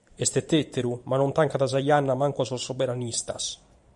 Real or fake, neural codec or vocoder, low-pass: fake; vocoder, 44.1 kHz, 128 mel bands every 512 samples, BigVGAN v2; 10.8 kHz